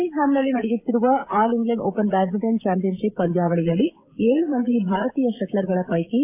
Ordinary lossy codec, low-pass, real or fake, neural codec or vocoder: AAC, 32 kbps; 3.6 kHz; fake; codec, 16 kHz, 16 kbps, FreqCodec, smaller model